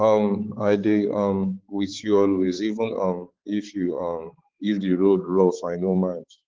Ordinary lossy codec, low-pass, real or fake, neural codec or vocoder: Opus, 16 kbps; 7.2 kHz; fake; codec, 16 kHz, 4 kbps, X-Codec, HuBERT features, trained on balanced general audio